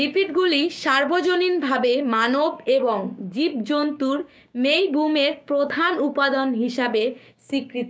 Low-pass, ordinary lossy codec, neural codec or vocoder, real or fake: none; none; codec, 16 kHz, 6 kbps, DAC; fake